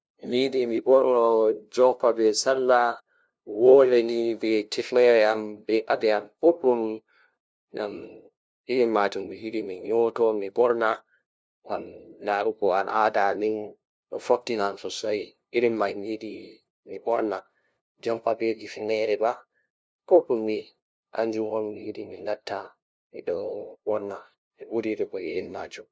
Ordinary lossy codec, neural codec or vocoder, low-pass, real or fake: none; codec, 16 kHz, 0.5 kbps, FunCodec, trained on LibriTTS, 25 frames a second; none; fake